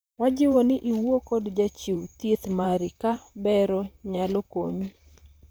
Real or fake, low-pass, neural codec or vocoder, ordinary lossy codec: fake; none; vocoder, 44.1 kHz, 128 mel bands, Pupu-Vocoder; none